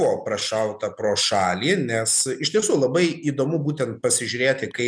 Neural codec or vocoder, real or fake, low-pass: none; real; 9.9 kHz